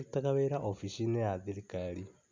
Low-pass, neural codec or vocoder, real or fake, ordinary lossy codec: 7.2 kHz; none; real; MP3, 64 kbps